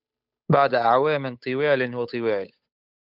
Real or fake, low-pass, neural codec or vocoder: fake; 5.4 kHz; codec, 16 kHz, 8 kbps, FunCodec, trained on Chinese and English, 25 frames a second